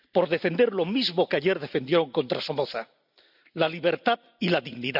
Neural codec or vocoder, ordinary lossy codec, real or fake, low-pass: none; none; real; 5.4 kHz